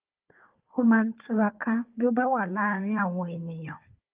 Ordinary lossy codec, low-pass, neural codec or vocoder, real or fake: Opus, 32 kbps; 3.6 kHz; codec, 16 kHz, 4 kbps, FunCodec, trained on Chinese and English, 50 frames a second; fake